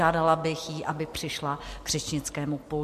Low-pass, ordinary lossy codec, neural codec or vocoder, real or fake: 14.4 kHz; MP3, 64 kbps; none; real